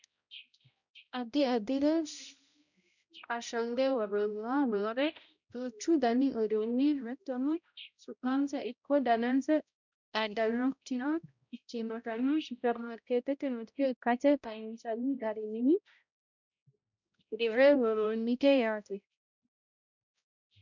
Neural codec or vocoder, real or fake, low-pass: codec, 16 kHz, 0.5 kbps, X-Codec, HuBERT features, trained on balanced general audio; fake; 7.2 kHz